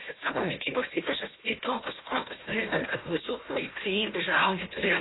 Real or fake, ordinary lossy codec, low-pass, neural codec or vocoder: fake; AAC, 16 kbps; 7.2 kHz; codec, 16 kHz, 1 kbps, FunCodec, trained on Chinese and English, 50 frames a second